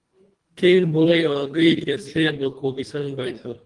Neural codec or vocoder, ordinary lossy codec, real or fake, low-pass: codec, 24 kHz, 1.5 kbps, HILCodec; Opus, 24 kbps; fake; 10.8 kHz